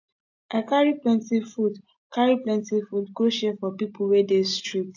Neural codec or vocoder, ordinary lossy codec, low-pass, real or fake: none; AAC, 48 kbps; 7.2 kHz; real